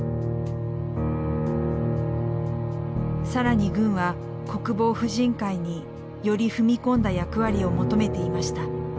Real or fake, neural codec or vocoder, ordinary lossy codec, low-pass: real; none; none; none